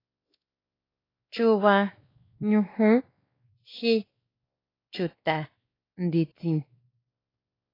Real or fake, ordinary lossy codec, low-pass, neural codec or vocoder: fake; AAC, 24 kbps; 5.4 kHz; codec, 16 kHz, 2 kbps, X-Codec, WavLM features, trained on Multilingual LibriSpeech